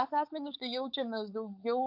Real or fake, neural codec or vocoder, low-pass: fake; codec, 16 kHz, 4.8 kbps, FACodec; 5.4 kHz